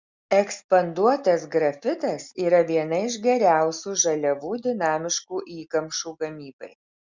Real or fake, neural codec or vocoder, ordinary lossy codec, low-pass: real; none; Opus, 64 kbps; 7.2 kHz